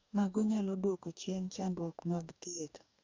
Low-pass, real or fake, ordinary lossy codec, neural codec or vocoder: 7.2 kHz; fake; MP3, 64 kbps; codec, 44.1 kHz, 2.6 kbps, DAC